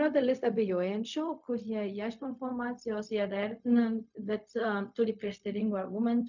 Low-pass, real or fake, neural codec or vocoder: 7.2 kHz; fake; codec, 16 kHz, 0.4 kbps, LongCat-Audio-Codec